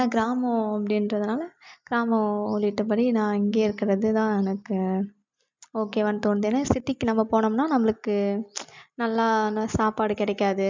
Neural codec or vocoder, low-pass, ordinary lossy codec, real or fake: none; 7.2 kHz; MP3, 64 kbps; real